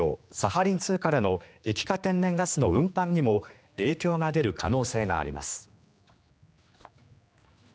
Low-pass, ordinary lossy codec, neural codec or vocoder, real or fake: none; none; codec, 16 kHz, 2 kbps, X-Codec, HuBERT features, trained on general audio; fake